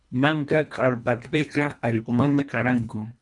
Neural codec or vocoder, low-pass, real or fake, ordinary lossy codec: codec, 24 kHz, 1.5 kbps, HILCodec; 10.8 kHz; fake; MP3, 96 kbps